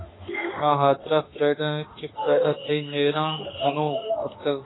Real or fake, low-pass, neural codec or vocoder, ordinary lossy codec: fake; 7.2 kHz; autoencoder, 48 kHz, 32 numbers a frame, DAC-VAE, trained on Japanese speech; AAC, 16 kbps